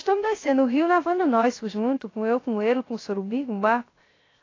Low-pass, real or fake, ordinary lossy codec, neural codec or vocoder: 7.2 kHz; fake; AAC, 32 kbps; codec, 16 kHz, 0.3 kbps, FocalCodec